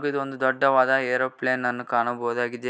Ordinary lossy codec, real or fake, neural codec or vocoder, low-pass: none; real; none; none